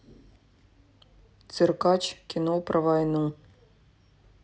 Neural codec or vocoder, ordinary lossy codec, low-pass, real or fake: none; none; none; real